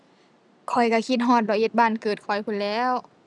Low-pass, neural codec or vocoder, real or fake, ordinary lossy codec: none; none; real; none